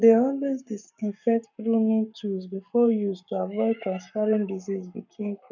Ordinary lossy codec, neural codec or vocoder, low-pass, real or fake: none; codec, 44.1 kHz, 7.8 kbps, Pupu-Codec; 7.2 kHz; fake